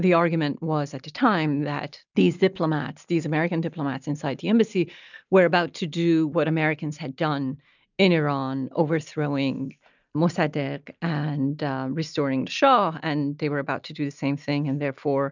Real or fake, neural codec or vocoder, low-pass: real; none; 7.2 kHz